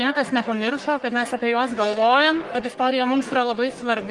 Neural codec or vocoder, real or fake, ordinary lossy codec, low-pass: codec, 44.1 kHz, 1.7 kbps, Pupu-Codec; fake; Opus, 24 kbps; 10.8 kHz